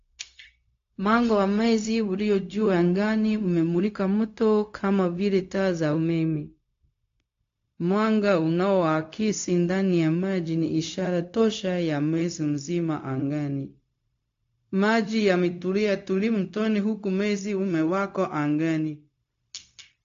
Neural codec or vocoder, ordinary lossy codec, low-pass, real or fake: codec, 16 kHz, 0.4 kbps, LongCat-Audio-Codec; AAC, 48 kbps; 7.2 kHz; fake